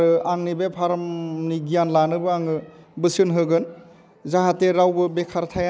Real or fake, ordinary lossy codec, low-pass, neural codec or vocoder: real; none; none; none